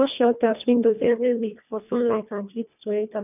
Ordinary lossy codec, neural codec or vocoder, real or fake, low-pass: none; codec, 24 kHz, 1.5 kbps, HILCodec; fake; 3.6 kHz